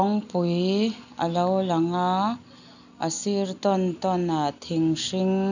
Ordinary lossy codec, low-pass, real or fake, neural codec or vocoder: none; 7.2 kHz; real; none